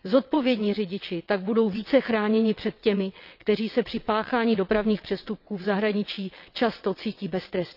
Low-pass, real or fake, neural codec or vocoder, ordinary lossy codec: 5.4 kHz; fake; vocoder, 22.05 kHz, 80 mel bands, WaveNeXt; none